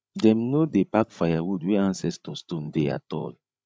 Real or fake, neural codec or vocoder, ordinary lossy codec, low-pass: fake; codec, 16 kHz, 16 kbps, FreqCodec, larger model; none; none